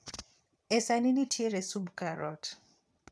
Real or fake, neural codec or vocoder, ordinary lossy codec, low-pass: fake; vocoder, 22.05 kHz, 80 mel bands, WaveNeXt; none; none